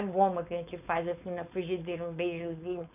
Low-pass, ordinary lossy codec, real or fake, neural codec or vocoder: 3.6 kHz; none; fake; codec, 16 kHz, 4.8 kbps, FACodec